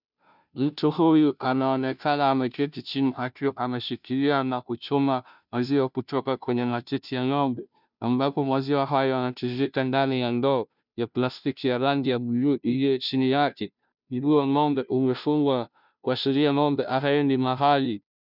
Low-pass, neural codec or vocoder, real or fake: 5.4 kHz; codec, 16 kHz, 0.5 kbps, FunCodec, trained on Chinese and English, 25 frames a second; fake